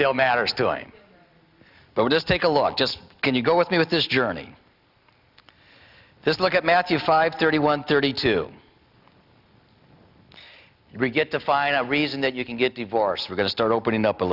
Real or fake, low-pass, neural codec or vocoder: real; 5.4 kHz; none